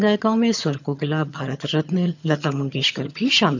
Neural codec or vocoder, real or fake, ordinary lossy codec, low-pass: vocoder, 22.05 kHz, 80 mel bands, HiFi-GAN; fake; none; 7.2 kHz